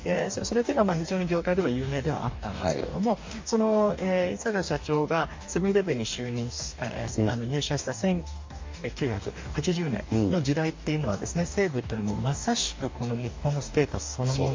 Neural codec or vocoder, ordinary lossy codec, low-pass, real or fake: codec, 44.1 kHz, 2.6 kbps, DAC; MP3, 48 kbps; 7.2 kHz; fake